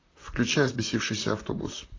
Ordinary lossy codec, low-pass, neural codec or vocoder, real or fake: AAC, 32 kbps; 7.2 kHz; vocoder, 44.1 kHz, 128 mel bands, Pupu-Vocoder; fake